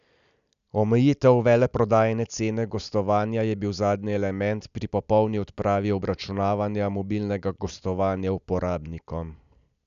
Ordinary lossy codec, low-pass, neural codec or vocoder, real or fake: none; 7.2 kHz; none; real